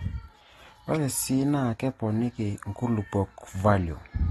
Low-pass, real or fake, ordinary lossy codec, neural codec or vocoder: 19.8 kHz; real; AAC, 32 kbps; none